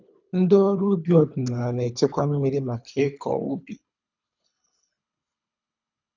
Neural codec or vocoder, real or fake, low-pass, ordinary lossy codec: codec, 24 kHz, 3 kbps, HILCodec; fake; 7.2 kHz; none